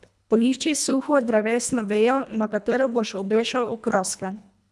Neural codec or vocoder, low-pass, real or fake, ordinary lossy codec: codec, 24 kHz, 1.5 kbps, HILCodec; none; fake; none